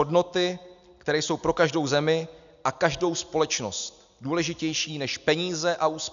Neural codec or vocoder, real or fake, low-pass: none; real; 7.2 kHz